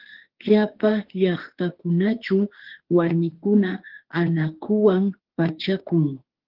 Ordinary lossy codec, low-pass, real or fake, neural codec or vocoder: Opus, 16 kbps; 5.4 kHz; fake; autoencoder, 48 kHz, 32 numbers a frame, DAC-VAE, trained on Japanese speech